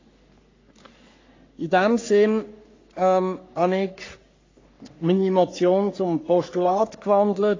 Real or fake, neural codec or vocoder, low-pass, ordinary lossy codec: fake; codec, 44.1 kHz, 3.4 kbps, Pupu-Codec; 7.2 kHz; AAC, 32 kbps